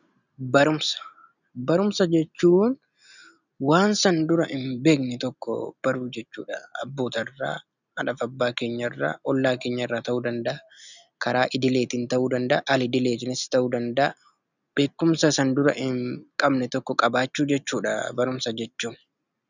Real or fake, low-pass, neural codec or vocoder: real; 7.2 kHz; none